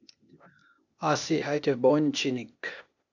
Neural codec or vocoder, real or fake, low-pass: codec, 16 kHz, 0.8 kbps, ZipCodec; fake; 7.2 kHz